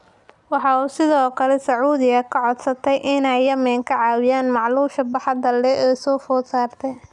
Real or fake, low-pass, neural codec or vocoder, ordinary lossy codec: real; 10.8 kHz; none; none